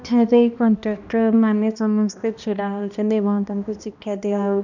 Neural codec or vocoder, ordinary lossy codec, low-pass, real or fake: codec, 16 kHz, 1 kbps, X-Codec, HuBERT features, trained on balanced general audio; none; 7.2 kHz; fake